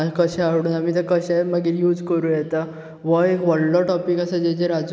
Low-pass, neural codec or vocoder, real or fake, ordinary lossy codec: none; none; real; none